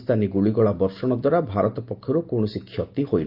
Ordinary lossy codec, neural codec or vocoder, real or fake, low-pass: Opus, 24 kbps; none; real; 5.4 kHz